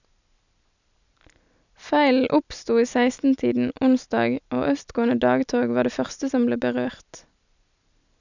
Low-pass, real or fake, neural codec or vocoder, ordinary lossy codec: 7.2 kHz; real; none; none